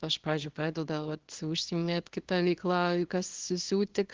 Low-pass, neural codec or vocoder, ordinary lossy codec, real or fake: 7.2 kHz; codec, 24 kHz, 0.9 kbps, WavTokenizer, small release; Opus, 16 kbps; fake